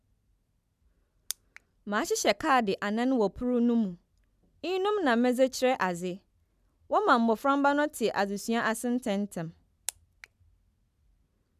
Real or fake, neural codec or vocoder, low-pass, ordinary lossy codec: real; none; 14.4 kHz; none